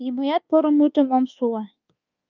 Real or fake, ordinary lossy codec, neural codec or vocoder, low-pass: fake; Opus, 24 kbps; codec, 24 kHz, 1.2 kbps, DualCodec; 7.2 kHz